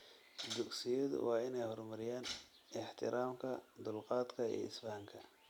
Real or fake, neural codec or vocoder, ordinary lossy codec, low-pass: real; none; none; 19.8 kHz